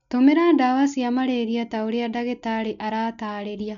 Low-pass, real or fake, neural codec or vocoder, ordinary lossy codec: 7.2 kHz; real; none; none